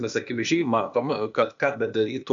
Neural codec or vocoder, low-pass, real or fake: codec, 16 kHz, 0.8 kbps, ZipCodec; 7.2 kHz; fake